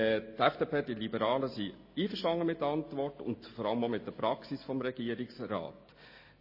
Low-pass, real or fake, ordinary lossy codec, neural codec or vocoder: 5.4 kHz; real; MP3, 24 kbps; none